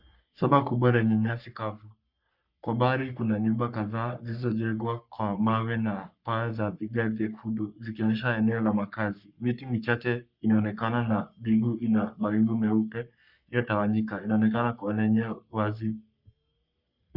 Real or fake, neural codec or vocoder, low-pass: fake; codec, 44.1 kHz, 3.4 kbps, Pupu-Codec; 5.4 kHz